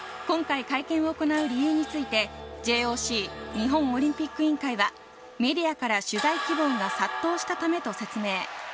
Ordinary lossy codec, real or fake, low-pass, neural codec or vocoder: none; real; none; none